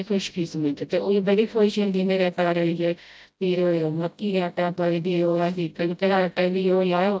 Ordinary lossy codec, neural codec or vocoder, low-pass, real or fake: none; codec, 16 kHz, 0.5 kbps, FreqCodec, smaller model; none; fake